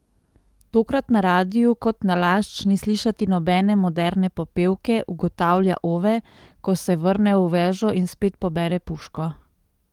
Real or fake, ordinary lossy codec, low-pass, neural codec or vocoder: fake; Opus, 24 kbps; 19.8 kHz; codec, 44.1 kHz, 7.8 kbps, DAC